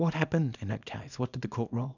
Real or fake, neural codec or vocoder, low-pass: fake; codec, 24 kHz, 0.9 kbps, WavTokenizer, small release; 7.2 kHz